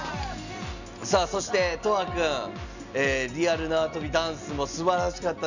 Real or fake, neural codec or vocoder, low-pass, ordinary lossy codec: real; none; 7.2 kHz; none